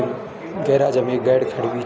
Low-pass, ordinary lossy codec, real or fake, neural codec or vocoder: none; none; real; none